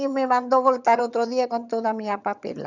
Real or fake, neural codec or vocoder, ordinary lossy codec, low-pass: fake; vocoder, 22.05 kHz, 80 mel bands, HiFi-GAN; none; 7.2 kHz